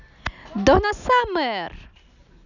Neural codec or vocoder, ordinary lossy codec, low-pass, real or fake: none; none; 7.2 kHz; real